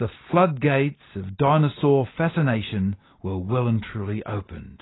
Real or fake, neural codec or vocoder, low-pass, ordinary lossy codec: real; none; 7.2 kHz; AAC, 16 kbps